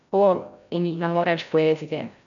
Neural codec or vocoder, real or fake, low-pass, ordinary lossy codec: codec, 16 kHz, 0.5 kbps, FreqCodec, larger model; fake; 7.2 kHz; none